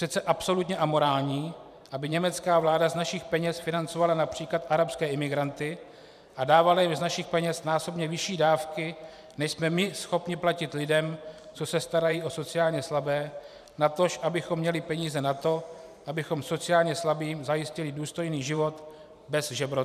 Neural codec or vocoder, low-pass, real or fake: vocoder, 44.1 kHz, 128 mel bands every 512 samples, BigVGAN v2; 14.4 kHz; fake